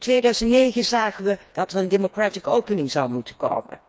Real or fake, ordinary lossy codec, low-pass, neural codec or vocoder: fake; none; none; codec, 16 kHz, 2 kbps, FreqCodec, smaller model